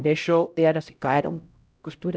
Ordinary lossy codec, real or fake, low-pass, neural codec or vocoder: none; fake; none; codec, 16 kHz, 0.5 kbps, X-Codec, HuBERT features, trained on LibriSpeech